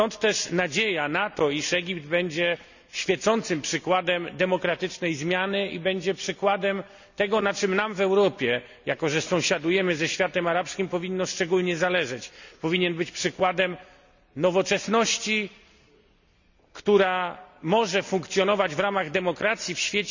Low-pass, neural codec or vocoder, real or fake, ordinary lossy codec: 7.2 kHz; none; real; none